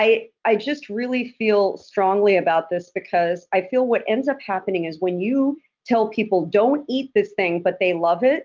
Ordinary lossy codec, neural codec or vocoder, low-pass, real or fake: Opus, 24 kbps; none; 7.2 kHz; real